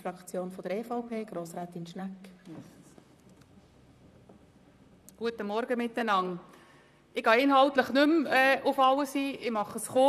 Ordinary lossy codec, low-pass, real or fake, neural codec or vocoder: none; 14.4 kHz; fake; vocoder, 44.1 kHz, 128 mel bands, Pupu-Vocoder